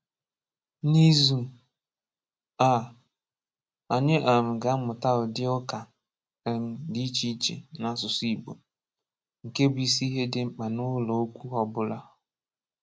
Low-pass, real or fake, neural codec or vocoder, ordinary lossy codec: none; real; none; none